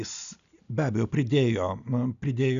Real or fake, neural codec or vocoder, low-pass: real; none; 7.2 kHz